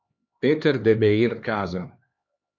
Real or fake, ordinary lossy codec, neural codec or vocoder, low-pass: fake; AAC, 48 kbps; codec, 16 kHz, 2 kbps, X-Codec, HuBERT features, trained on LibriSpeech; 7.2 kHz